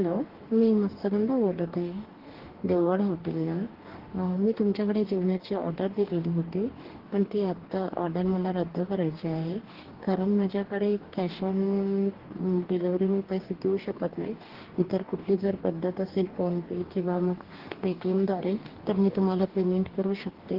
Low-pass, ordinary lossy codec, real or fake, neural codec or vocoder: 5.4 kHz; Opus, 16 kbps; fake; codec, 44.1 kHz, 2.6 kbps, DAC